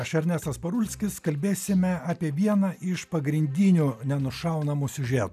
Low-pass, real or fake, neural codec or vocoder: 14.4 kHz; real; none